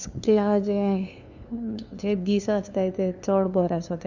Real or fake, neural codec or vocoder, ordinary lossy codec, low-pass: fake; codec, 16 kHz, 2 kbps, FunCodec, trained on LibriTTS, 25 frames a second; none; 7.2 kHz